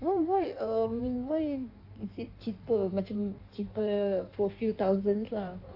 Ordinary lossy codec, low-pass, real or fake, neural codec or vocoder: AAC, 32 kbps; 5.4 kHz; fake; codec, 16 kHz in and 24 kHz out, 1.1 kbps, FireRedTTS-2 codec